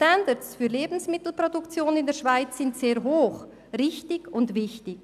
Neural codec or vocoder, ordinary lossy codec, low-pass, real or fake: none; none; 14.4 kHz; real